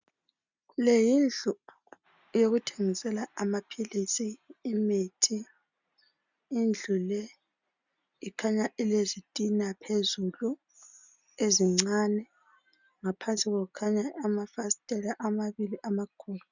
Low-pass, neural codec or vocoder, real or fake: 7.2 kHz; none; real